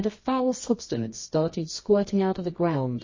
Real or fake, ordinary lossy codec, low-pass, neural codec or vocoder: fake; MP3, 32 kbps; 7.2 kHz; codec, 24 kHz, 0.9 kbps, WavTokenizer, medium music audio release